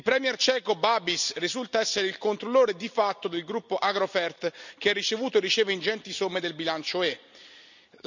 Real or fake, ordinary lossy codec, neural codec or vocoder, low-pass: real; none; none; 7.2 kHz